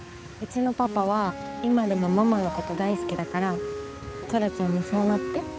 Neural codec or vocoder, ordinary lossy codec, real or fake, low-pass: codec, 16 kHz, 4 kbps, X-Codec, HuBERT features, trained on balanced general audio; none; fake; none